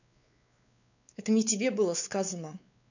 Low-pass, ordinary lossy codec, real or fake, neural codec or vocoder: 7.2 kHz; none; fake; codec, 16 kHz, 4 kbps, X-Codec, WavLM features, trained on Multilingual LibriSpeech